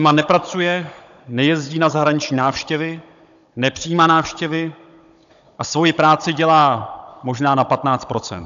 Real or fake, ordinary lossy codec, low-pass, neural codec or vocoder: fake; AAC, 96 kbps; 7.2 kHz; codec, 16 kHz, 16 kbps, FunCodec, trained on Chinese and English, 50 frames a second